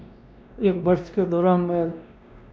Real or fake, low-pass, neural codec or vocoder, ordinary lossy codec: fake; none; codec, 16 kHz, 1 kbps, X-Codec, WavLM features, trained on Multilingual LibriSpeech; none